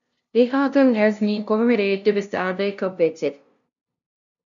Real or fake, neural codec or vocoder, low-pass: fake; codec, 16 kHz, 0.5 kbps, FunCodec, trained on LibriTTS, 25 frames a second; 7.2 kHz